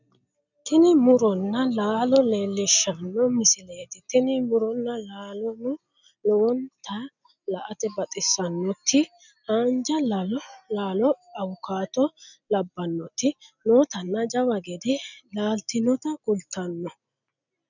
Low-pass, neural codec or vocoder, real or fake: 7.2 kHz; none; real